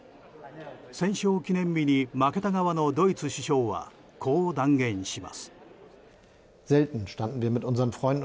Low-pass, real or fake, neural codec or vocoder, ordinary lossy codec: none; real; none; none